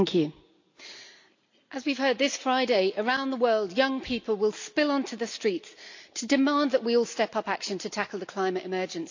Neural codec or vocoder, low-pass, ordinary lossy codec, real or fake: none; 7.2 kHz; AAC, 48 kbps; real